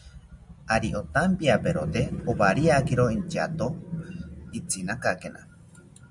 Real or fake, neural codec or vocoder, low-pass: real; none; 10.8 kHz